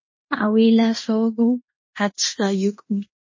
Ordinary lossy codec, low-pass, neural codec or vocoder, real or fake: MP3, 32 kbps; 7.2 kHz; codec, 16 kHz in and 24 kHz out, 0.9 kbps, LongCat-Audio-Codec, fine tuned four codebook decoder; fake